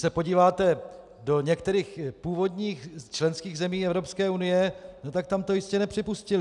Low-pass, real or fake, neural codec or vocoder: 10.8 kHz; real; none